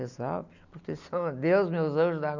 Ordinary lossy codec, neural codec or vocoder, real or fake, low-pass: none; none; real; 7.2 kHz